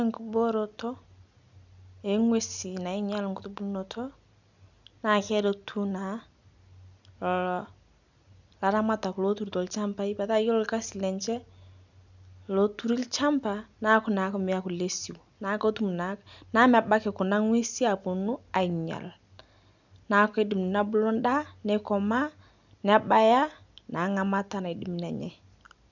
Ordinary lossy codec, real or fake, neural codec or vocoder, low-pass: none; real; none; 7.2 kHz